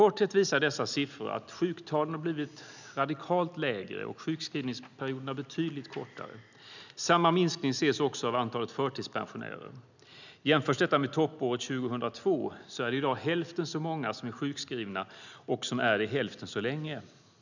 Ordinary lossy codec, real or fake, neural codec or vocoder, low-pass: none; real; none; 7.2 kHz